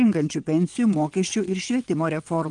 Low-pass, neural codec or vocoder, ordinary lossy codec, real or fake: 9.9 kHz; vocoder, 22.05 kHz, 80 mel bands, WaveNeXt; Opus, 24 kbps; fake